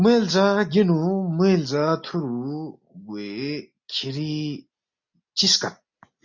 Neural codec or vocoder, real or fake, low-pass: none; real; 7.2 kHz